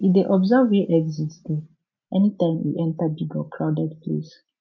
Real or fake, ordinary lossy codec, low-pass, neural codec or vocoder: real; none; 7.2 kHz; none